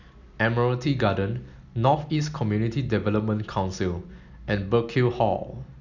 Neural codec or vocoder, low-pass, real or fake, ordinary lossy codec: none; 7.2 kHz; real; none